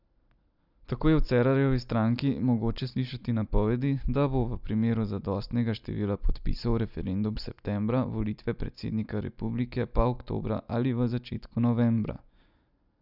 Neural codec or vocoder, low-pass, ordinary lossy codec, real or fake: none; 5.4 kHz; none; real